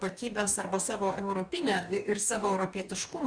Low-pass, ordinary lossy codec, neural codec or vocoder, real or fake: 9.9 kHz; Opus, 64 kbps; codec, 44.1 kHz, 2.6 kbps, DAC; fake